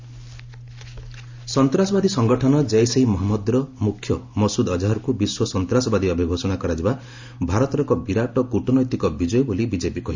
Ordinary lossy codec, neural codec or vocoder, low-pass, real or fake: MP3, 64 kbps; none; 7.2 kHz; real